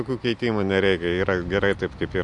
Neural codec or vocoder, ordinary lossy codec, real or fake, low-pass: none; MP3, 64 kbps; real; 10.8 kHz